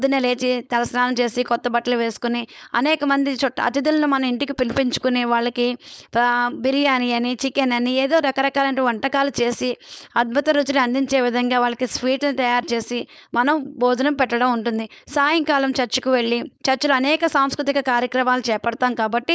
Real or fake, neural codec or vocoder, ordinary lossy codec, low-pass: fake; codec, 16 kHz, 4.8 kbps, FACodec; none; none